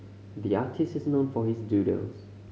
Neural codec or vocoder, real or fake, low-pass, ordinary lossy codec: none; real; none; none